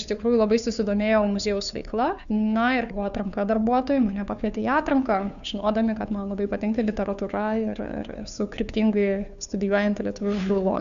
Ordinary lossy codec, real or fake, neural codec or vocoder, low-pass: MP3, 96 kbps; fake; codec, 16 kHz, 2 kbps, FunCodec, trained on Chinese and English, 25 frames a second; 7.2 kHz